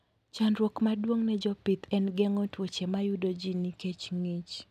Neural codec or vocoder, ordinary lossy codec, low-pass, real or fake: none; none; 14.4 kHz; real